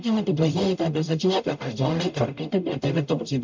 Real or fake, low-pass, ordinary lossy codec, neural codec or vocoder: fake; 7.2 kHz; MP3, 64 kbps; codec, 44.1 kHz, 0.9 kbps, DAC